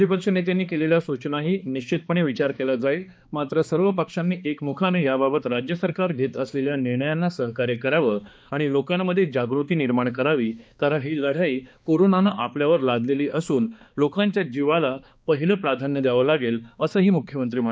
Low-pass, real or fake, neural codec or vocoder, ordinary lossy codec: none; fake; codec, 16 kHz, 2 kbps, X-Codec, HuBERT features, trained on balanced general audio; none